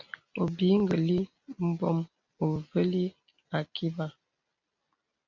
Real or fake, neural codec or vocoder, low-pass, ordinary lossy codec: real; none; 7.2 kHz; MP3, 64 kbps